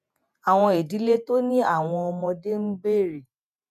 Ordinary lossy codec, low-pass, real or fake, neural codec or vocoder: MP3, 96 kbps; 14.4 kHz; fake; vocoder, 48 kHz, 128 mel bands, Vocos